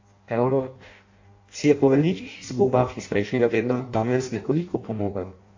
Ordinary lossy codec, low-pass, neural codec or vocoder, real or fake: AAC, 48 kbps; 7.2 kHz; codec, 16 kHz in and 24 kHz out, 0.6 kbps, FireRedTTS-2 codec; fake